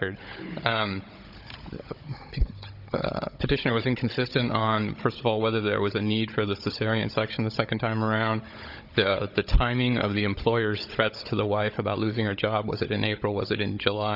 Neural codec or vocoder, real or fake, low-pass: codec, 16 kHz, 16 kbps, FunCodec, trained on Chinese and English, 50 frames a second; fake; 5.4 kHz